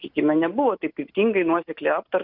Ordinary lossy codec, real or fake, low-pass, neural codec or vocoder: Opus, 64 kbps; real; 5.4 kHz; none